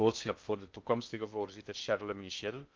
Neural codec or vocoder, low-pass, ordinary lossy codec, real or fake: codec, 16 kHz in and 24 kHz out, 0.6 kbps, FocalCodec, streaming, 2048 codes; 7.2 kHz; Opus, 32 kbps; fake